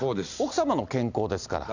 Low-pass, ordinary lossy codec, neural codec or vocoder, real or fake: 7.2 kHz; none; none; real